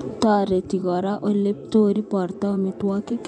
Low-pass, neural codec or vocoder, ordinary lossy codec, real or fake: 10.8 kHz; vocoder, 24 kHz, 100 mel bands, Vocos; none; fake